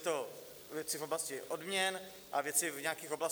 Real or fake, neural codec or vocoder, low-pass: real; none; 19.8 kHz